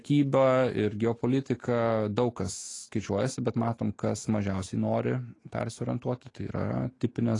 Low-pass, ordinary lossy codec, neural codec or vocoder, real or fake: 10.8 kHz; AAC, 32 kbps; autoencoder, 48 kHz, 128 numbers a frame, DAC-VAE, trained on Japanese speech; fake